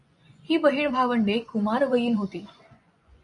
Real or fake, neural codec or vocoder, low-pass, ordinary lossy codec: fake; vocoder, 24 kHz, 100 mel bands, Vocos; 10.8 kHz; MP3, 96 kbps